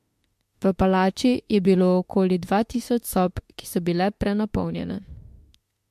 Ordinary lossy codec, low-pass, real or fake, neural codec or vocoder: MP3, 64 kbps; 14.4 kHz; fake; autoencoder, 48 kHz, 32 numbers a frame, DAC-VAE, trained on Japanese speech